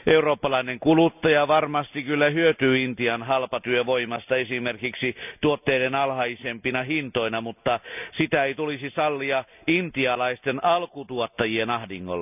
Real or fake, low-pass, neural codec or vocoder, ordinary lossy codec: real; 3.6 kHz; none; none